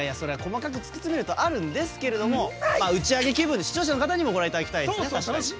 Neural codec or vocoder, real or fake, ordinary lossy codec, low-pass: none; real; none; none